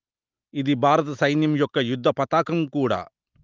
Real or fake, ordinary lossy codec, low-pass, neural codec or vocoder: real; Opus, 32 kbps; 7.2 kHz; none